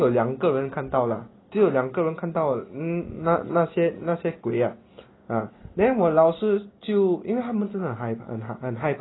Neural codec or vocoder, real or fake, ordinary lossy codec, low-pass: none; real; AAC, 16 kbps; 7.2 kHz